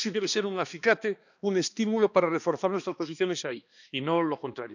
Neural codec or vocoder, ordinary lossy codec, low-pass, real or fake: codec, 16 kHz, 2 kbps, X-Codec, HuBERT features, trained on balanced general audio; none; 7.2 kHz; fake